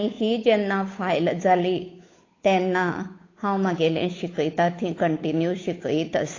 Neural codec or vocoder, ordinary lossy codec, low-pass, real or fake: codec, 16 kHz, 8 kbps, FunCodec, trained on Chinese and English, 25 frames a second; AAC, 32 kbps; 7.2 kHz; fake